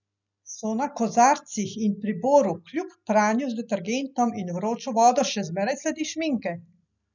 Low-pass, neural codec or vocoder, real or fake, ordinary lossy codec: 7.2 kHz; none; real; none